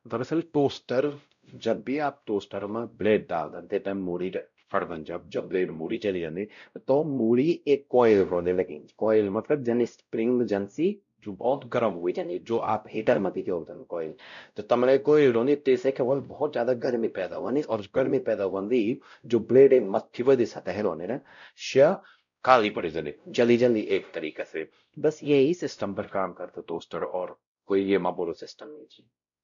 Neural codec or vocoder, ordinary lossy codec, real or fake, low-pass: codec, 16 kHz, 0.5 kbps, X-Codec, WavLM features, trained on Multilingual LibriSpeech; none; fake; 7.2 kHz